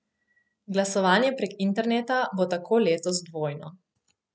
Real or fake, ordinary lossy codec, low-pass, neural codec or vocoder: real; none; none; none